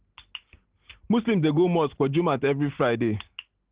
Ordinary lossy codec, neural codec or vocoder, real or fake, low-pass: Opus, 16 kbps; none; real; 3.6 kHz